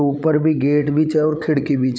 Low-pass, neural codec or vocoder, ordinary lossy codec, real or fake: none; codec, 16 kHz, 16 kbps, FreqCodec, larger model; none; fake